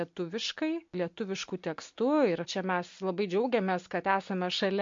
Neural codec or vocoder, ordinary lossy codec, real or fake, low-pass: none; MP3, 48 kbps; real; 7.2 kHz